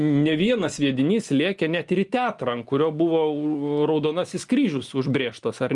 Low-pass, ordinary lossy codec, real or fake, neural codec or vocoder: 10.8 kHz; Opus, 32 kbps; real; none